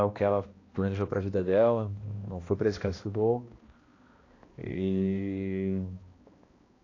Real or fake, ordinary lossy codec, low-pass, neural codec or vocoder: fake; AAC, 32 kbps; 7.2 kHz; codec, 16 kHz, 1 kbps, X-Codec, HuBERT features, trained on balanced general audio